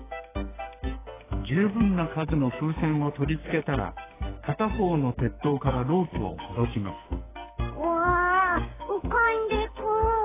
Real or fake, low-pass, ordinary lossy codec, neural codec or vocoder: fake; 3.6 kHz; AAC, 16 kbps; codec, 44.1 kHz, 2.6 kbps, SNAC